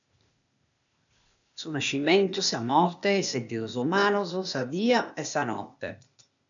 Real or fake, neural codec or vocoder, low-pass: fake; codec, 16 kHz, 0.8 kbps, ZipCodec; 7.2 kHz